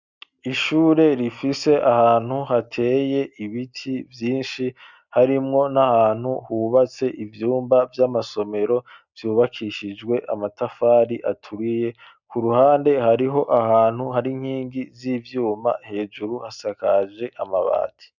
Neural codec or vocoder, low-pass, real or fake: none; 7.2 kHz; real